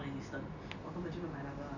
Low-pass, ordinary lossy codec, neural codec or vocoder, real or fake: 7.2 kHz; none; none; real